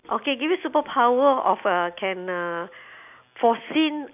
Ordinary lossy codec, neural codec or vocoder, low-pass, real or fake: none; none; 3.6 kHz; real